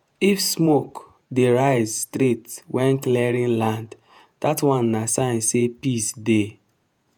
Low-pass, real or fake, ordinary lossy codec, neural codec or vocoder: none; fake; none; vocoder, 48 kHz, 128 mel bands, Vocos